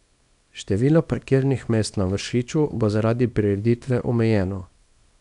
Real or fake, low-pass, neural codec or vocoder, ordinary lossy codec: fake; 10.8 kHz; codec, 24 kHz, 0.9 kbps, WavTokenizer, small release; none